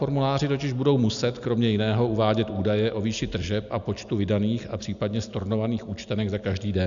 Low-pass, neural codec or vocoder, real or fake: 7.2 kHz; none; real